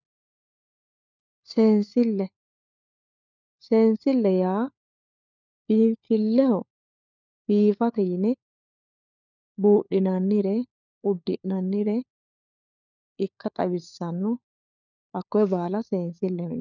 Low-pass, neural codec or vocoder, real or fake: 7.2 kHz; codec, 16 kHz, 4 kbps, FunCodec, trained on LibriTTS, 50 frames a second; fake